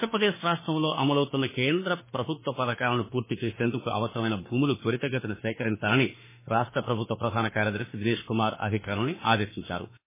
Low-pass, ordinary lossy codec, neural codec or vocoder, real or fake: 3.6 kHz; MP3, 16 kbps; autoencoder, 48 kHz, 32 numbers a frame, DAC-VAE, trained on Japanese speech; fake